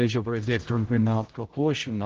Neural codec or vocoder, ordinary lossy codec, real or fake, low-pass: codec, 16 kHz, 0.5 kbps, X-Codec, HuBERT features, trained on general audio; Opus, 16 kbps; fake; 7.2 kHz